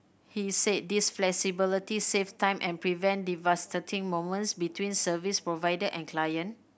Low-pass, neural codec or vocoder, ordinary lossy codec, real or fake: none; none; none; real